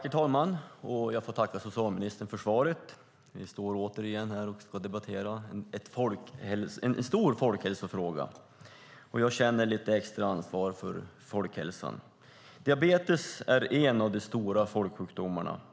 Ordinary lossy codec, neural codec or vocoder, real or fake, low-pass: none; none; real; none